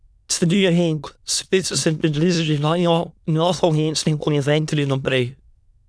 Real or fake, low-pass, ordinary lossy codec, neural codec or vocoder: fake; none; none; autoencoder, 22.05 kHz, a latent of 192 numbers a frame, VITS, trained on many speakers